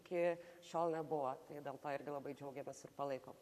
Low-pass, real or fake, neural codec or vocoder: 14.4 kHz; fake; codec, 44.1 kHz, 7.8 kbps, Pupu-Codec